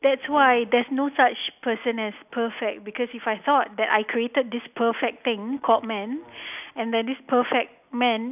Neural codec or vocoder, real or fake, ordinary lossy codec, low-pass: none; real; none; 3.6 kHz